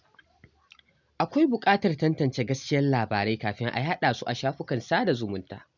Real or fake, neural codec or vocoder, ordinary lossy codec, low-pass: real; none; none; 7.2 kHz